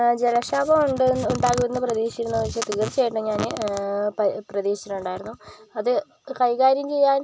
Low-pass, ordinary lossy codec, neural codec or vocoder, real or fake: none; none; none; real